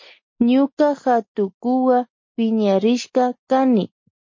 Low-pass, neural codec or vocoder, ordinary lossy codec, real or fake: 7.2 kHz; none; MP3, 32 kbps; real